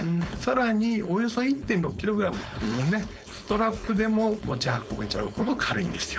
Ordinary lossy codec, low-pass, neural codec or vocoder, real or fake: none; none; codec, 16 kHz, 4.8 kbps, FACodec; fake